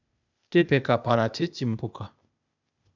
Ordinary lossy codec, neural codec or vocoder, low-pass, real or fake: none; codec, 16 kHz, 0.8 kbps, ZipCodec; 7.2 kHz; fake